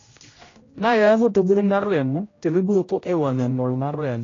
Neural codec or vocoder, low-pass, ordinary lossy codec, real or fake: codec, 16 kHz, 0.5 kbps, X-Codec, HuBERT features, trained on general audio; 7.2 kHz; AAC, 32 kbps; fake